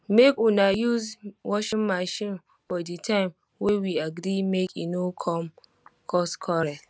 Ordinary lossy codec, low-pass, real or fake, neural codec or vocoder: none; none; real; none